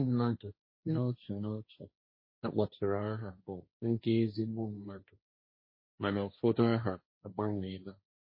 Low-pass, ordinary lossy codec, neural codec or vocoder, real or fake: 5.4 kHz; MP3, 24 kbps; codec, 16 kHz, 1.1 kbps, Voila-Tokenizer; fake